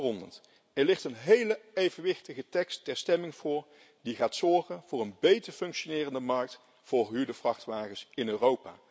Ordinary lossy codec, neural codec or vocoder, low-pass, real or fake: none; none; none; real